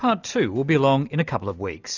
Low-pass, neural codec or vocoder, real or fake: 7.2 kHz; none; real